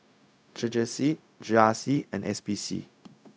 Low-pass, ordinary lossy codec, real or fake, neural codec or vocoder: none; none; fake; codec, 16 kHz, 0.4 kbps, LongCat-Audio-Codec